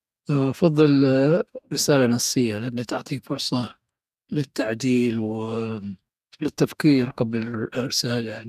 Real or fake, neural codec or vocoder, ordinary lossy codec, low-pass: fake; codec, 44.1 kHz, 2.6 kbps, DAC; none; 14.4 kHz